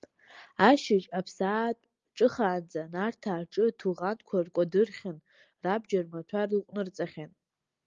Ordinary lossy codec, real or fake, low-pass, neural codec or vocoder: Opus, 32 kbps; real; 7.2 kHz; none